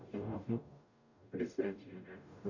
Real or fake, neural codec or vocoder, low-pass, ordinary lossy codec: fake; codec, 44.1 kHz, 0.9 kbps, DAC; 7.2 kHz; none